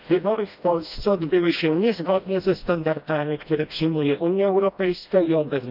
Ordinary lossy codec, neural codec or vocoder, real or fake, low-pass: none; codec, 16 kHz, 1 kbps, FreqCodec, smaller model; fake; 5.4 kHz